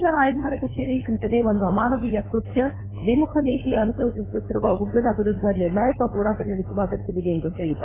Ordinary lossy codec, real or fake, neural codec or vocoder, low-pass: AAC, 16 kbps; fake; codec, 16 kHz, 2 kbps, FunCodec, trained on LibriTTS, 25 frames a second; 3.6 kHz